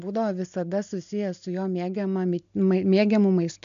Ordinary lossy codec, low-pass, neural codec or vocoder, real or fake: MP3, 64 kbps; 7.2 kHz; none; real